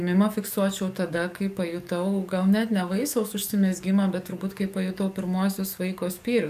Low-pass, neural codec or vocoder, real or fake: 14.4 kHz; codec, 44.1 kHz, 7.8 kbps, DAC; fake